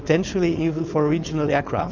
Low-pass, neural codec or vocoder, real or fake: 7.2 kHz; codec, 16 kHz, 4.8 kbps, FACodec; fake